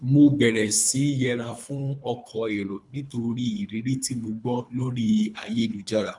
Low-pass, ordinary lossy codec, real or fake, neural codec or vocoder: 10.8 kHz; none; fake; codec, 24 kHz, 3 kbps, HILCodec